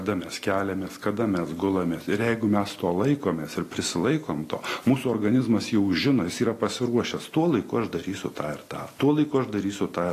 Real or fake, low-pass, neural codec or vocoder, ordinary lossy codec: real; 14.4 kHz; none; AAC, 48 kbps